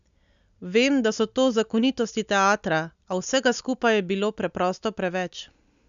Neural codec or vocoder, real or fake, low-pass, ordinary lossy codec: none; real; 7.2 kHz; MP3, 96 kbps